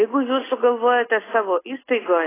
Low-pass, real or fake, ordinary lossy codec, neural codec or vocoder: 3.6 kHz; real; AAC, 16 kbps; none